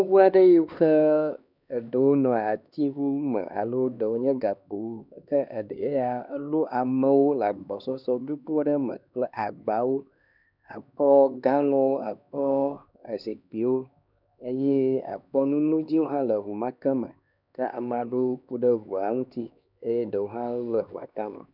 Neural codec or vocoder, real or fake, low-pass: codec, 16 kHz, 2 kbps, X-Codec, HuBERT features, trained on LibriSpeech; fake; 5.4 kHz